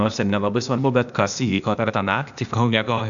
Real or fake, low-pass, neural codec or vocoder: fake; 7.2 kHz; codec, 16 kHz, 0.8 kbps, ZipCodec